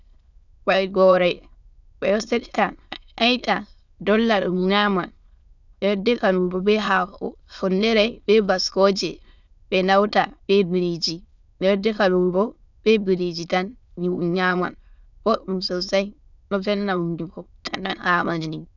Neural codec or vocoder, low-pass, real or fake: autoencoder, 22.05 kHz, a latent of 192 numbers a frame, VITS, trained on many speakers; 7.2 kHz; fake